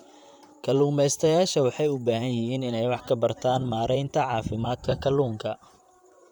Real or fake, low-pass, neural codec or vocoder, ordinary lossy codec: fake; 19.8 kHz; vocoder, 44.1 kHz, 128 mel bands, Pupu-Vocoder; none